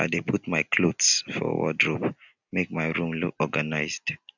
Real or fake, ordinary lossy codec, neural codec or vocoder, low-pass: real; none; none; 7.2 kHz